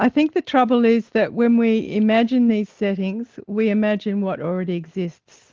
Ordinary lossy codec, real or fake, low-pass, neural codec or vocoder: Opus, 16 kbps; real; 7.2 kHz; none